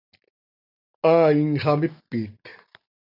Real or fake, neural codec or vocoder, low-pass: real; none; 5.4 kHz